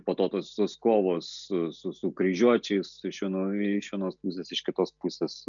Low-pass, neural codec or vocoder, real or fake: 7.2 kHz; none; real